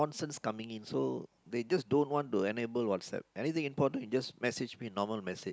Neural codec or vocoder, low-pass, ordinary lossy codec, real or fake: none; none; none; real